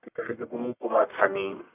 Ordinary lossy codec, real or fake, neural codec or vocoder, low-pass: none; fake; codec, 44.1 kHz, 1.7 kbps, Pupu-Codec; 3.6 kHz